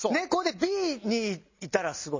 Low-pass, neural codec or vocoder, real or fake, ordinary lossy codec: 7.2 kHz; none; real; MP3, 32 kbps